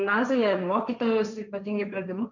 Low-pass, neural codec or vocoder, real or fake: 7.2 kHz; codec, 16 kHz, 1.1 kbps, Voila-Tokenizer; fake